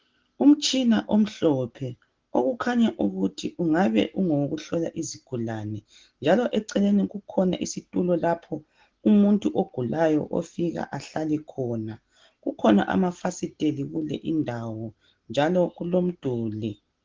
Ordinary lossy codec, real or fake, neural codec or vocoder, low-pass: Opus, 16 kbps; real; none; 7.2 kHz